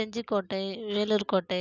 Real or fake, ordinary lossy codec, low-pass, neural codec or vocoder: real; none; 7.2 kHz; none